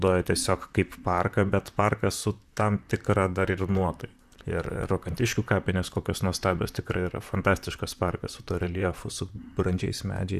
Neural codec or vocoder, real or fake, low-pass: vocoder, 44.1 kHz, 128 mel bands, Pupu-Vocoder; fake; 14.4 kHz